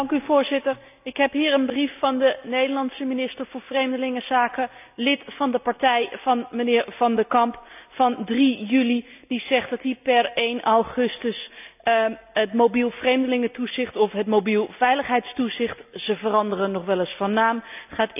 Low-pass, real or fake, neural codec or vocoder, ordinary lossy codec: 3.6 kHz; real; none; none